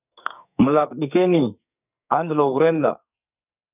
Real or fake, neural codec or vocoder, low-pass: fake; codec, 44.1 kHz, 2.6 kbps, SNAC; 3.6 kHz